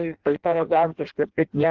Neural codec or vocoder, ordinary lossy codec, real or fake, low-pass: codec, 16 kHz in and 24 kHz out, 0.6 kbps, FireRedTTS-2 codec; Opus, 16 kbps; fake; 7.2 kHz